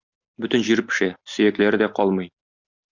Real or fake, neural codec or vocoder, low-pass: real; none; 7.2 kHz